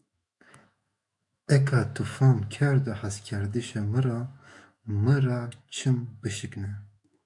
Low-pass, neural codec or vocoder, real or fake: 10.8 kHz; autoencoder, 48 kHz, 128 numbers a frame, DAC-VAE, trained on Japanese speech; fake